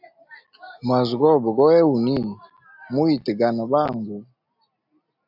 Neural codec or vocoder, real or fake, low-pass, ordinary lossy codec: none; real; 5.4 kHz; AAC, 48 kbps